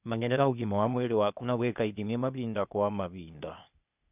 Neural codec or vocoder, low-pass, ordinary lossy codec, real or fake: codec, 16 kHz, 0.8 kbps, ZipCodec; 3.6 kHz; none; fake